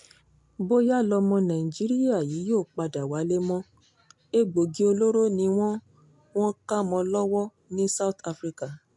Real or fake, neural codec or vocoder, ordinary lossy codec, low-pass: real; none; MP3, 64 kbps; 10.8 kHz